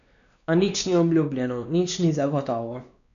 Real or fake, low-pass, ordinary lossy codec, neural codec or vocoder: fake; 7.2 kHz; none; codec, 16 kHz, 2 kbps, X-Codec, WavLM features, trained on Multilingual LibriSpeech